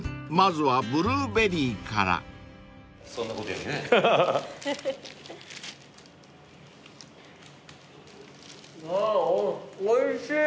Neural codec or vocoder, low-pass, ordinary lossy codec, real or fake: none; none; none; real